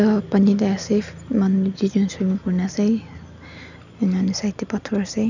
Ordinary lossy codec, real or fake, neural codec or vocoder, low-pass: none; real; none; 7.2 kHz